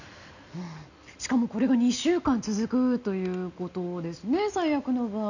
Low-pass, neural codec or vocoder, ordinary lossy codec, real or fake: 7.2 kHz; none; none; real